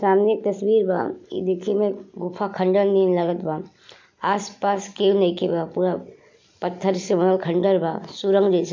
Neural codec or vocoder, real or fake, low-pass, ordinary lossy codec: none; real; 7.2 kHz; MP3, 64 kbps